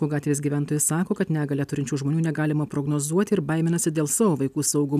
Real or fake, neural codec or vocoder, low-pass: real; none; 14.4 kHz